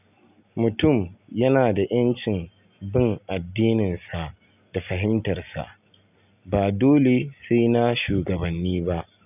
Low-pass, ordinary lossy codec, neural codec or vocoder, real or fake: 3.6 kHz; none; none; real